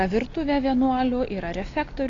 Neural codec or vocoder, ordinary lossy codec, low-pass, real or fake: none; AAC, 32 kbps; 7.2 kHz; real